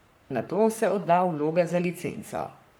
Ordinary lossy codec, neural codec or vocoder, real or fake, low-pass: none; codec, 44.1 kHz, 3.4 kbps, Pupu-Codec; fake; none